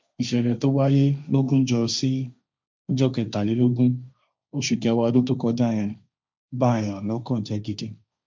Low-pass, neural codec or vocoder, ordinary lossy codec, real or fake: none; codec, 16 kHz, 1.1 kbps, Voila-Tokenizer; none; fake